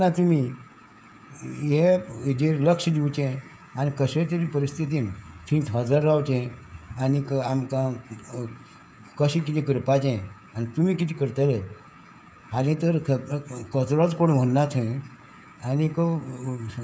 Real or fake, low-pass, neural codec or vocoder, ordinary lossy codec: fake; none; codec, 16 kHz, 16 kbps, FreqCodec, smaller model; none